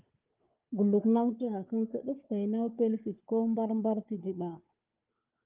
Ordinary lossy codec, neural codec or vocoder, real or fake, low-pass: Opus, 32 kbps; codec, 16 kHz, 4 kbps, FunCodec, trained on Chinese and English, 50 frames a second; fake; 3.6 kHz